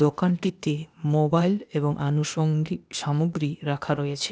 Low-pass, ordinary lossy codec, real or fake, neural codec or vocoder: none; none; fake; codec, 16 kHz, 0.8 kbps, ZipCodec